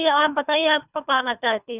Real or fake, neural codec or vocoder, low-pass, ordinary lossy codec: fake; codec, 24 kHz, 3 kbps, HILCodec; 3.6 kHz; none